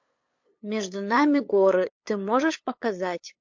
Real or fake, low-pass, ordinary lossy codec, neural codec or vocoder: fake; 7.2 kHz; MP3, 48 kbps; codec, 16 kHz, 8 kbps, FunCodec, trained on LibriTTS, 25 frames a second